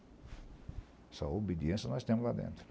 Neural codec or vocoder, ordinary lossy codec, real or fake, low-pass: none; none; real; none